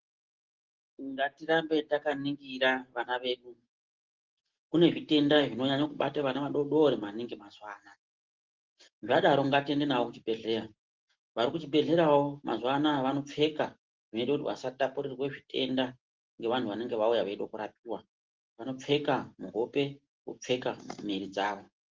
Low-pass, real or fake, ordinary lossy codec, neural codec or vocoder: 7.2 kHz; real; Opus, 16 kbps; none